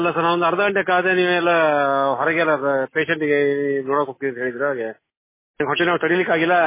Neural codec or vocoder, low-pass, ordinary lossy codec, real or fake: none; 3.6 kHz; MP3, 16 kbps; real